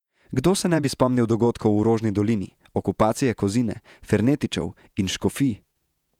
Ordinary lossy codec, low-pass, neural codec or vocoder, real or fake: none; 19.8 kHz; vocoder, 48 kHz, 128 mel bands, Vocos; fake